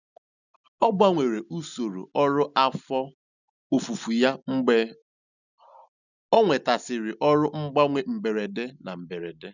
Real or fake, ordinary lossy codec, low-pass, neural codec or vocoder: real; none; 7.2 kHz; none